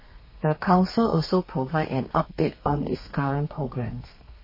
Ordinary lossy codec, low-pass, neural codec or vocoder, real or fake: MP3, 24 kbps; 5.4 kHz; codec, 32 kHz, 1.9 kbps, SNAC; fake